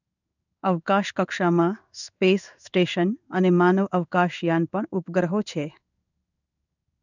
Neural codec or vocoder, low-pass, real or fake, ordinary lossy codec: codec, 16 kHz in and 24 kHz out, 1 kbps, XY-Tokenizer; 7.2 kHz; fake; none